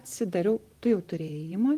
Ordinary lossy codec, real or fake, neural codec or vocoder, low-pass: Opus, 24 kbps; fake; vocoder, 44.1 kHz, 128 mel bands, Pupu-Vocoder; 14.4 kHz